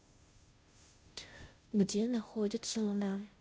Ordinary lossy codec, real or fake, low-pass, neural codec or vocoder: none; fake; none; codec, 16 kHz, 0.5 kbps, FunCodec, trained on Chinese and English, 25 frames a second